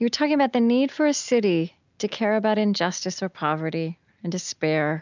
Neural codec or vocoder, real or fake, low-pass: none; real; 7.2 kHz